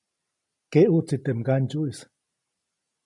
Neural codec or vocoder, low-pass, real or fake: none; 10.8 kHz; real